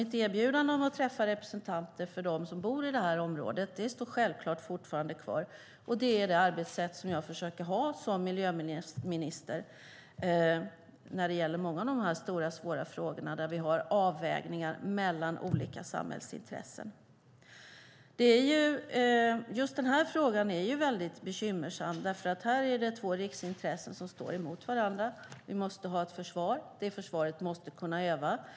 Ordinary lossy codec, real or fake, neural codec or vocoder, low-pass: none; real; none; none